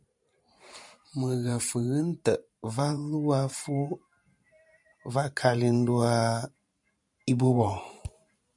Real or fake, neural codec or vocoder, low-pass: fake; vocoder, 44.1 kHz, 128 mel bands every 512 samples, BigVGAN v2; 10.8 kHz